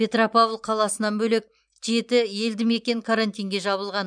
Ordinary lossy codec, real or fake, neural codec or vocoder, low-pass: none; fake; vocoder, 44.1 kHz, 128 mel bands every 512 samples, BigVGAN v2; 9.9 kHz